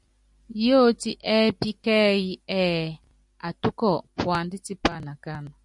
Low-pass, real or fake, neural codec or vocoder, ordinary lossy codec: 10.8 kHz; real; none; MP3, 96 kbps